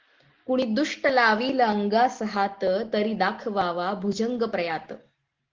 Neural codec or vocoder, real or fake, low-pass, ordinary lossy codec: none; real; 7.2 kHz; Opus, 16 kbps